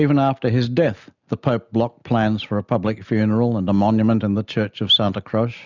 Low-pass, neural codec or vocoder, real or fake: 7.2 kHz; none; real